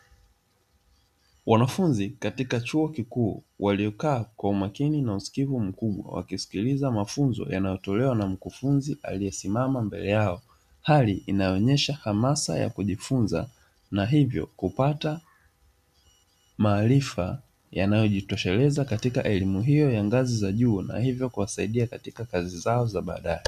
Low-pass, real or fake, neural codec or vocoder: 14.4 kHz; real; none